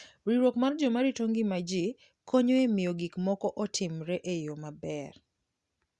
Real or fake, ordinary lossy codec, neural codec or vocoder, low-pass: real; Opus, 64 kbps; none; 10.8 kHz